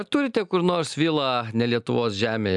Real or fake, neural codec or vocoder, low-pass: real; none; 10.8 kHz